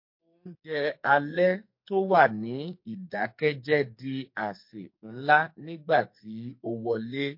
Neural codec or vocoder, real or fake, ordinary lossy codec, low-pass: codec, 44.1 kHz, 2.6 kbps, SNAC; fake; MP3, 32 kbps; 5.4 kHz